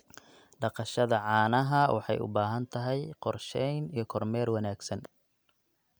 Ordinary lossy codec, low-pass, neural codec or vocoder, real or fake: none; none; none; real